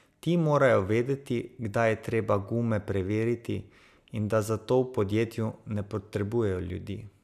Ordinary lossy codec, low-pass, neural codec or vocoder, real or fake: none; 14.4 kHz; none; real